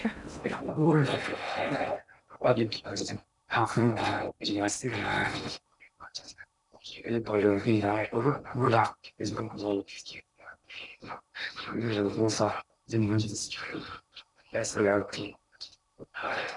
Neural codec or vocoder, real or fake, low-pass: codec, 16 kHz in and 24 kHz out, 0.6 kbps, FocalCodec, streaming, 2048 codes; fake; 10.8 kHz